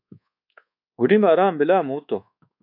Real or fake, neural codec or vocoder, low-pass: fake; codec, 24 kHz, 1.2 kbps, DualCodec; 5.4 kHz